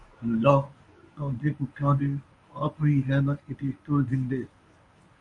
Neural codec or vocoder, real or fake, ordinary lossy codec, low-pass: codec, 24 kHz, 0.9 kbps, WavTokenizer, medium speech release version 1; fake; MP3, 48 kbps; 10.8 kHz